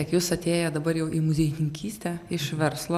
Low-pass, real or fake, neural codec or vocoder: 14.4 kHz; real; none